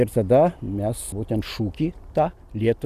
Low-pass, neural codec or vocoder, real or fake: 14.4 kHz; none; real